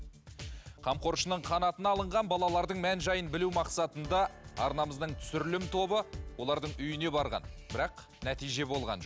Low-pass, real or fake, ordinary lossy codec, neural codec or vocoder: none; real; none; none